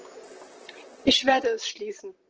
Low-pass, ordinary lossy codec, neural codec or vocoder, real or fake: 7.2 kHz; Opus, 16 kbps; vocoder, 44.1 kHz, 128 mel bands, Pupu-Vocoder; fake